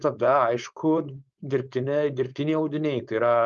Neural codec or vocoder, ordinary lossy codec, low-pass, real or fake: codec, 16 kHz, 4.8 kbps, FACodec; Opus, 32 kbps; 7.2 kHz; fake